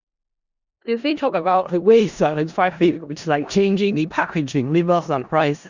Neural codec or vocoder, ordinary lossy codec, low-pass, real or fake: codec, 16 kHz in and 24 kHz out, 0.4 kbps, LongCat-Audio-Codec, four codebook decoder; Opus, 64 kbps; 7.2 kHz; fake